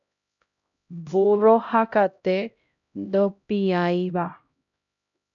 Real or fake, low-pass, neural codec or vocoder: fake; 7.2 kHz; codec, 16 kHz, 0.5 kbps, X-Codec, HuBERT features, trained on LibriSpeech